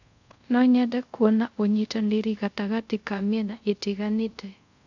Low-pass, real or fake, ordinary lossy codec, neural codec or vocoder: 7.2 kHz; fake; none; codec, 24 kHz, 0.5 kbps, DualCodec